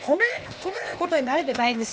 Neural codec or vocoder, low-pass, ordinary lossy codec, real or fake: codec, 16 kHz, 0.8 kbps, ZipCodec; none; none; fake